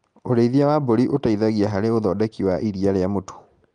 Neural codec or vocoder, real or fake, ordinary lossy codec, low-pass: none; real; Opus, 32 kbps; 9.9 kHz